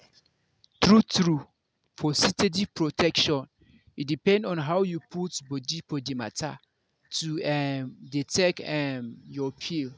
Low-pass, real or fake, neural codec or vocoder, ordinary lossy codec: none; real; none; none